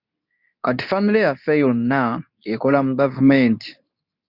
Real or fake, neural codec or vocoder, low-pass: fake; codec, 24 kHz, 0.9 kbps, WavTokenizer, medium speech release version 2; 5.4 kHz